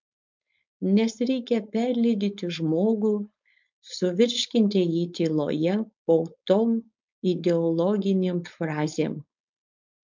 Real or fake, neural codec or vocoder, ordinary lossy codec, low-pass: fake; codec, 16 kHz, 4.8 kbps, FACodec; MP3, 64 kbps; 7.2 kHz